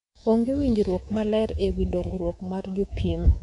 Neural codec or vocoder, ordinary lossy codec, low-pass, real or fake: codec, 24 kHz, 3.1 kbps, DualCodec; none; 10.8 kHz; fake